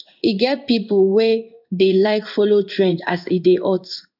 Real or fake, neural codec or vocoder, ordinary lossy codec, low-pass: fake; codec, 16 kHz in and 24 kHz out, 1 kbps, XY-Tokenizer; none; 5.4 kHz